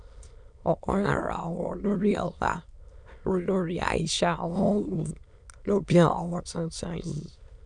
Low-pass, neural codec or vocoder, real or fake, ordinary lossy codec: 9.9 kHz; autoencoder, 22.05 kHz, a latent of 192 numbers a frame, VITS, trained on many speakers; fake; none